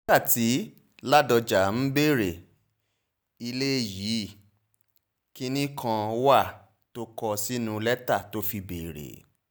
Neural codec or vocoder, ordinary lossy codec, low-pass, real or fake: none; none; none; real